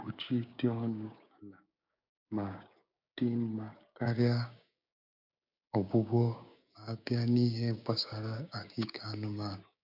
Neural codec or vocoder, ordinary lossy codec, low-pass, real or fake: codec, 16 kHz, 6 kbps, DAC; none; 5.4 kHz; fake